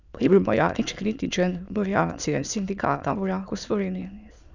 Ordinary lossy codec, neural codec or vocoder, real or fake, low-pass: none; autoencoder, 22.05 kHz, a latent of 192 numbers a frame, VITS, trained on many speakers; fake; 7.2 kHz